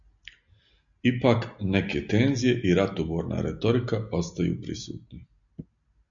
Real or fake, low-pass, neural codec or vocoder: real; 7.2 kHz; none